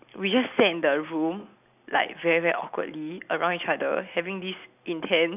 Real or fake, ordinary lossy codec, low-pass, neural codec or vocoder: real; none; 3.6 kHz; none